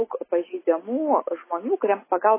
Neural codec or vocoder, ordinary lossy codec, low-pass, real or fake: none; MP3, 16 kbps; 3.6 kHz; real